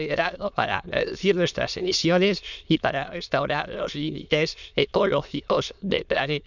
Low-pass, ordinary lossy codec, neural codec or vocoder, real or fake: 7.2 kHz; none; autoencoder, 22.05 kHz, a latent of 192 numbers a frame, VITS, trained on many speakers; fake